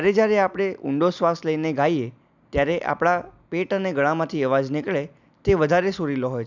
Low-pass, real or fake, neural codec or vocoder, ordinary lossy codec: 7.2 kHz; real; none; none